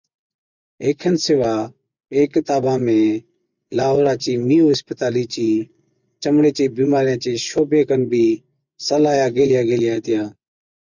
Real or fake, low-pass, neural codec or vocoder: fake; 7.2 kHz; vocoder, 24 kHz, 100 mel bands, Vocos